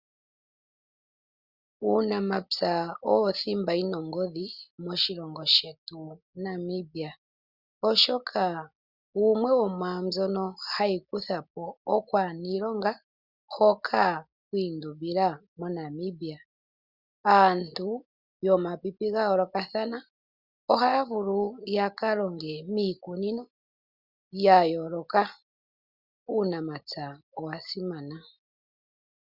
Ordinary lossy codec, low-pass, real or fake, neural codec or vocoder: Opus, 64 kbps; 5.4 kHz; real; none